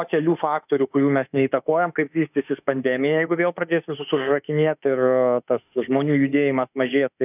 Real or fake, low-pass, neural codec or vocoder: fake; 3.6 kHz; autoencoder, 48 kHz, 32 numbers a frame, DAC-VAE, trained on Japanese speech